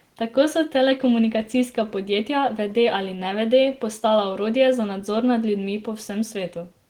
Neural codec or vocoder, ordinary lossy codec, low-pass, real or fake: none; Opus, 16 kbps; 19.8 kHz; real